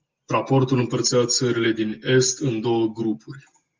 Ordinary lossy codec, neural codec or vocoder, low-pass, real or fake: Opus, 24 kbps; none; 7.2 kHz; real